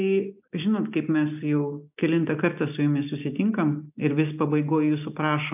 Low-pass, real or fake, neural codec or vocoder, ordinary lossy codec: 3.6 kHz; real; none; AAC, 32 kbps